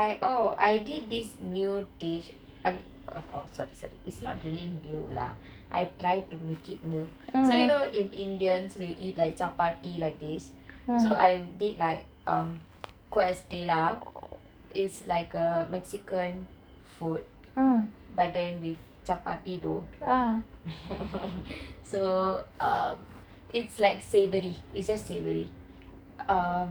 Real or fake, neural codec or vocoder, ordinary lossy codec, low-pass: fake; codec, 44.1 kHz, 2.6 kbps, SNAC; none; none